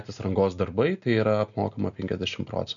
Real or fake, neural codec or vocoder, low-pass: real; none; 7.2 kHz